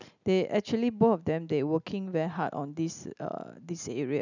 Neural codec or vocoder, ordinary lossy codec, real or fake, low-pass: none; none; real; 7.2 kHz